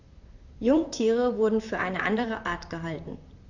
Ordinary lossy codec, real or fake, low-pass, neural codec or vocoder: Opus, 64 kbps; fake; 7.2 kHz; vocoder, 44.1 kHz, 128 mel bands, Pupu-Vocoder